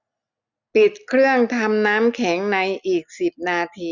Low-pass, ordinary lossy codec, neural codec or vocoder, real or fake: 7.2 kHz; none; none; real